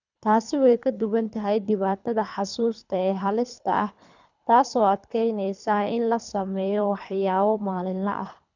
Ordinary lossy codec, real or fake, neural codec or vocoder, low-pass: none; fake; codec, 24 kHz, 3 kbps, HILCodec; 7.2 kHz